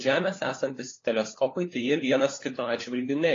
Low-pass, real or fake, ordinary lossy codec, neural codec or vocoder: 7.2 kHz; fake; AAC, 32 kbps; codec, 16 kHz, 4 kbps, FunCodec, trained on LibriTTS, 50 frames a second